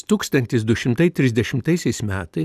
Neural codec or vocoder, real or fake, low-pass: none; real; 14.4 kHz